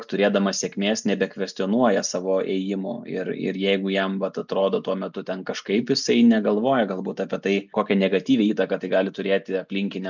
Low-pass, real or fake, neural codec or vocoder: 7.2 kHz; real; none